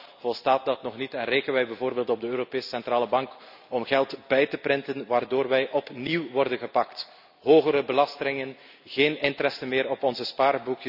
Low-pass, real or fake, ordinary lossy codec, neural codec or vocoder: 5.4 kHz; real; none; none